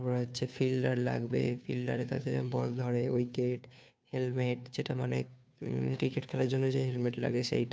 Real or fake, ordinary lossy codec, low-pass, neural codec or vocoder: fake; none; none; codec, 16 kHz, 2 kbps, FunCodec, trained on Chinese and English, 25 frames a second